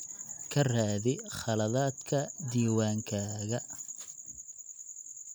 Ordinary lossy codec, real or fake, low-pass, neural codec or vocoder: none; real; none; none